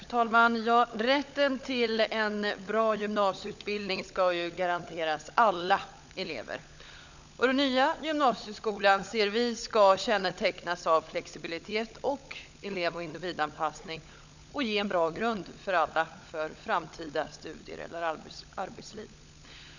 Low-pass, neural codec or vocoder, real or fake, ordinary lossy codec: 7.2 kHz; codec, 16 kHz, 16 kbps, FunCodec, trained on LibriTTS, 50 frames a second; fake; none